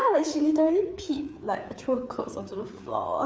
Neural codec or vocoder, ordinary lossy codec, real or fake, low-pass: codec, 16 kHz, 4 kbps, FreqCodec, larger model; none; fake; none